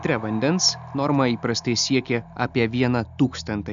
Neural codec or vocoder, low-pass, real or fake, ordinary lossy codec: none; 7.2 kHz; real; AAC, 96 kbps